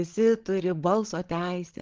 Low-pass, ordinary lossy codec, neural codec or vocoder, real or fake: 7.2 kHz; Opus, 16 kbps; codec, 16 kHz, 16 kbps, FreqCodec, larger model; fake